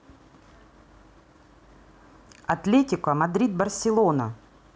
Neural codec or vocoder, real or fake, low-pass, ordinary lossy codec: none; real; none; none